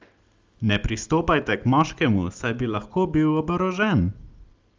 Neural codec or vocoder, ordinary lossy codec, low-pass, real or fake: none; Opus, 32 kbps; 7.2 kHz; real